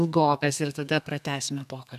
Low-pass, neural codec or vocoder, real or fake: 14.4 kHz; codec, 32 kHz, 1.9 kbps, SNAC; fake